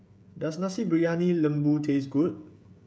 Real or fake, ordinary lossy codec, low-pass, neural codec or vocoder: fake; none; none; codec, 16 kHz, 16 kbps, FreqCodec, smaller model